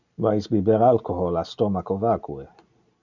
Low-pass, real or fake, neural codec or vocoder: 7.2 kHz; real; none